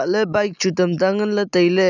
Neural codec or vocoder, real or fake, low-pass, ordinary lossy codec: none; real; 7.2 kHz; none